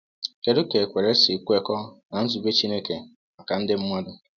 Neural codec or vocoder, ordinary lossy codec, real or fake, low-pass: none; none; real; 7.2 kHz